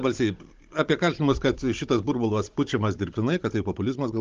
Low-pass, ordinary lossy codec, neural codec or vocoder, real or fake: 7.2 kHz; Opus, 32 kbps; codec, 16 kHz, 16 kbps, FunCodec, trained on Chinese and English, 50 frames a second; fake